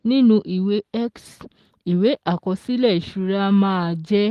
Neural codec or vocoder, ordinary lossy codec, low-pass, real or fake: none; Opus, 24 kbps; 14.4 kHz; real